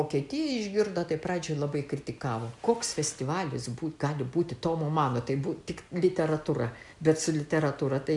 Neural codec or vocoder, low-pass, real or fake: none; 10.8 kHz; real